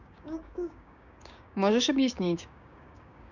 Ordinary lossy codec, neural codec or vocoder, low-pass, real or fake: none; codec, 16 kHz, 6 kbps, DAC; 7.2 kHz; fake